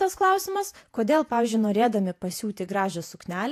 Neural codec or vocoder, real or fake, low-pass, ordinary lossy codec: vocoder, 48 kHz, 128 mel bands, Vocos; fake; 14.4 kHz; AAC, 64 kbps